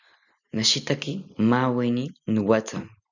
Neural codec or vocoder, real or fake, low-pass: none; real; 7.2 kHz